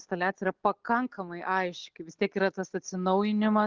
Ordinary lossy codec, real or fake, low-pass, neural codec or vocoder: Opus, 16 kbps; real; 7.2 kHz; none